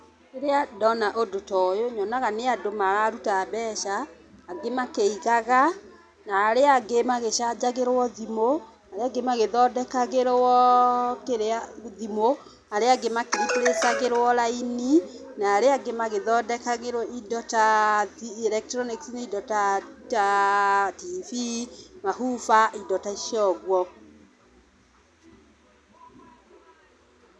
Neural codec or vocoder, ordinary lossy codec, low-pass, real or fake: none; none; none; real